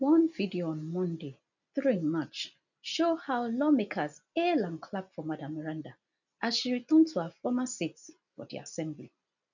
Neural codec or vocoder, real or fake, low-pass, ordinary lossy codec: none; real; 7.2 kHz; none